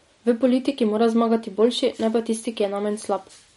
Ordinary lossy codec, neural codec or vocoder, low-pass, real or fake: MP3, 48 kbps; none; 19.8 kHz; real